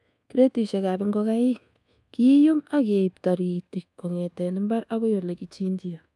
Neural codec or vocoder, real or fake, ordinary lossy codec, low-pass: codec, 24 kHz, 1.2 kbps, DualCodec; fake; none; none